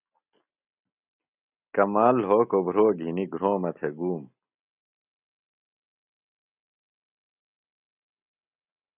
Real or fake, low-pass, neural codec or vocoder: real; 3.6 kHz; none